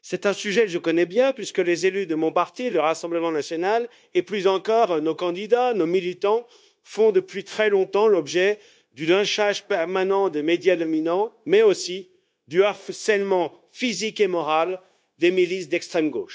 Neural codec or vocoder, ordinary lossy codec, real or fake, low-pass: codec, 16 kHz, 0.9 kbps, LongCat-Audio-Codec; none; fake; none